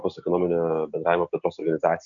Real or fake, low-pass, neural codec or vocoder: real; 7.2 kHz; none